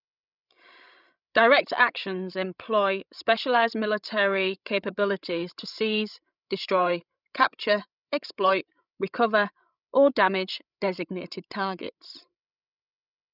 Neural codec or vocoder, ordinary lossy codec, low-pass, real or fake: codec, 16 kHz, 16 kbps, FreqCodec, larger model; none; 5.4 kHz; fake